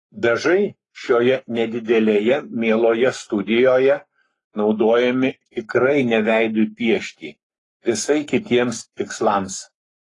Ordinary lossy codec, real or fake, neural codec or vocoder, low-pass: AAC, 32 kbps; fake; codec, 44.1 kHz, 7.8 kbps, Pupu-Codec; 10.8 kHz